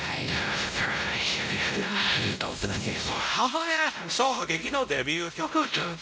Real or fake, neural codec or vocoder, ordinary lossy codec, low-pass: fake; codec, 16 kHz, 0.5 kbps, X-Codec, WavLM features, trained on Multilingual LibriSpeech; none; none